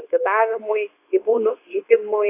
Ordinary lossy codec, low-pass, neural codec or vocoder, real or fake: AAC, 24 kbps; 3.6 kHz; codec, 24 kHz, 0.9 kbps, WavTokenizer, medium speech release version 2; fake